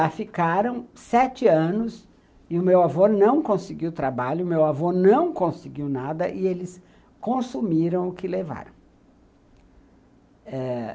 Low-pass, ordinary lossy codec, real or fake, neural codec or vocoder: none; none; real; none